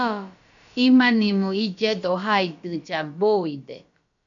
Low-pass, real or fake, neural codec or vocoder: 7.2 kHz; fake; codec, 16 kHz, about 1 kbps, DyCAST, with the encoder's durations